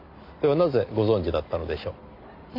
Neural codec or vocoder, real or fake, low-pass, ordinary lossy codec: none; real; 5.4 kHz; MP3, 48 kbps